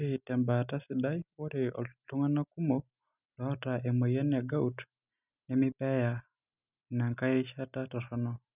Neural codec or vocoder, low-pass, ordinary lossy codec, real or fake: none; 3.6 kHz; none; real